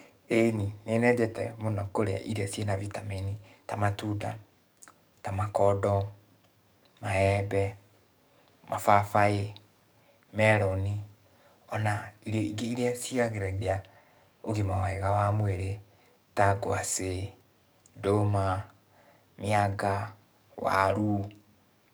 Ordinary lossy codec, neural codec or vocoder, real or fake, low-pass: none; codec, 44.1 kHz, 7.8 kbps, DAC; fake; none